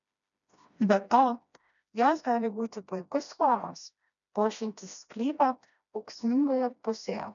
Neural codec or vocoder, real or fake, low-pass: codec, 16 kHz, 1 kbps, FreqCodec, smaller model; fake; 7.2 kHz